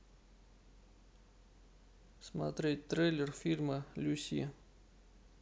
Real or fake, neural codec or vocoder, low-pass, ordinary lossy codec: real; none; none; none